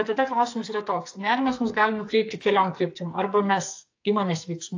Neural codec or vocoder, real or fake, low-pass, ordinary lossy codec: codec, 44.1 kHz, 2.6 kbps, SNAC; fake; 7.2 kHz; AAC, 48 kbps